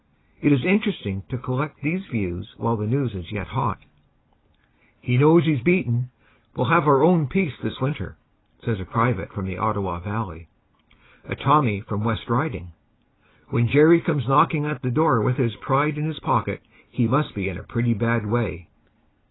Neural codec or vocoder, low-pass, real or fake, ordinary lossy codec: none; 7.2 kHz; real; AAC, 16 kbps